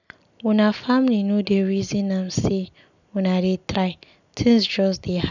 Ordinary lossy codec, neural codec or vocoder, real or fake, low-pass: none; none; real; 7.2 kHz